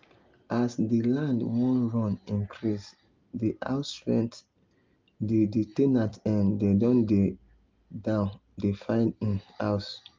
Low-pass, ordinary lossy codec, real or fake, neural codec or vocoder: 7.2 kHz; Opus, 24 kbps; real; none